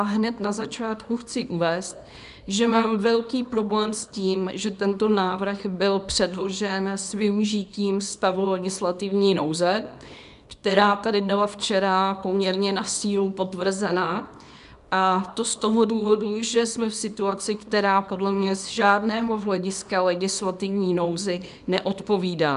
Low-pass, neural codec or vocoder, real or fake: 10.8 kHz; codec, 24 kHz, 0.9 kbps, WavTokenizer, small release; fake